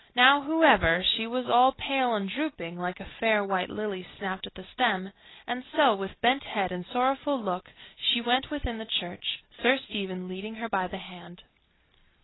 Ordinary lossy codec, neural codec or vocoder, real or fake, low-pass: AAC, 16 kbps; none; real; 7.2 kHz